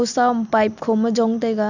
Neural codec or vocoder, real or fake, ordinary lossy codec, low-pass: none; real; none; 7.2 kHz